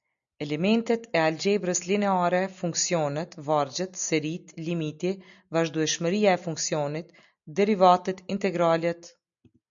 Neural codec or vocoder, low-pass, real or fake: none; 7.2 kHz; real